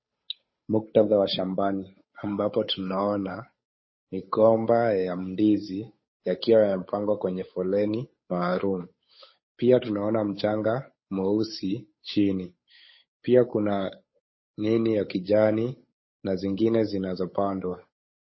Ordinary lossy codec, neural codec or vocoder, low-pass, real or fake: MP3, 24 kbps; codec, 16 kHz, 8 kbps, FunCodec, trained on Chinese and English, 25 frames a second; 7.2 kHz; fake